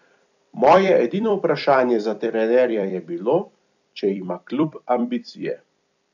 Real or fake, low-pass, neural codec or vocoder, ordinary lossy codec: fake; 7.2 kHz; vocoder, 44.1 kHz, 128 mel bands every 256 samples, BigVGAN v2; none